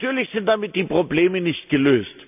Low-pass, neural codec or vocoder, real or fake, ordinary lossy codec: 3.6 kHz; none; real; none